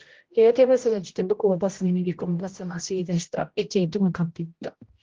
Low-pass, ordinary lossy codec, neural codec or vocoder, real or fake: 7.2 kHz; Opus, 16 kbps; codec, 16 kHz, 0.5 kbps, X-Codec, HuBERT features, trained on general audio; fake